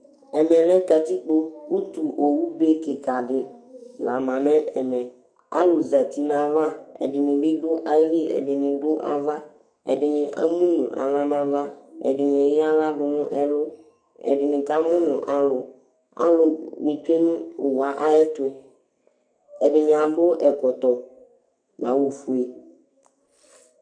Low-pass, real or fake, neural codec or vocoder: 9.9 kHz; fake; codec, 44.1 kHz, 2.6 kbps, SNAC